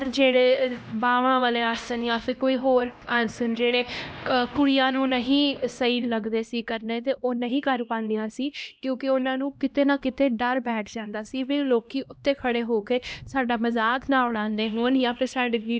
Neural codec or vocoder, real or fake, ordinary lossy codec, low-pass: codec, 16 kHz, 1 kbps, X-Codec, HuBERT features, trained on LibriSpeech; fake; none; none